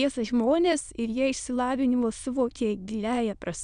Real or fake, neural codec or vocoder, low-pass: fake; autoencoder, 22.05 kHz, a latent of 192 numbers a frame, VITS, trained on many speakers; 9.9 kHz